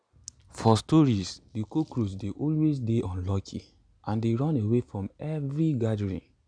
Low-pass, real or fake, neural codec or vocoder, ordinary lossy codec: 9.9 kHz; real; none; none